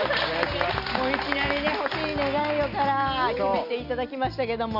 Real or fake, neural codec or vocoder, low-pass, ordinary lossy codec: real; none; 5.4 kHz; none